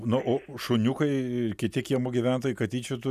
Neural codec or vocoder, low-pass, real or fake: none; 14.4 kHz; real